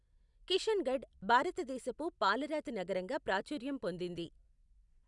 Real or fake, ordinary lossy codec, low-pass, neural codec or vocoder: real; none; 10.8 kHz; none